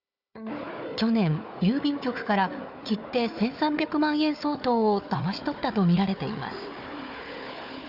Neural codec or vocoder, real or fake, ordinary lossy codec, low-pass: codec, 16 kHz, 4 kbps, FunCodec, trained on Chinese and English, 50 frames a second; fake; none; 5.4 kHz